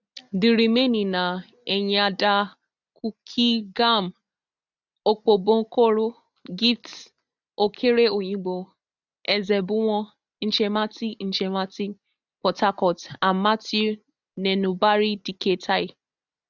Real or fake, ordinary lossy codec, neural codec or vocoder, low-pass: real; none; none; 7.2 kHz